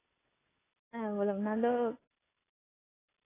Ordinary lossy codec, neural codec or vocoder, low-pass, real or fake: AAC, 16 kbps; none; 3.6 kHz; real